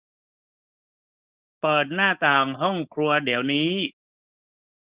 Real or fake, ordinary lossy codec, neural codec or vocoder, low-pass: fake; Opus, 64 kbps; codec, 16 kHz, 4.8 kbps, FACodec; 3.6 kHz